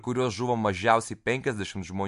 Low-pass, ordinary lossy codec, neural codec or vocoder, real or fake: 10.8 kHz; MP3, 64 kbps; none; real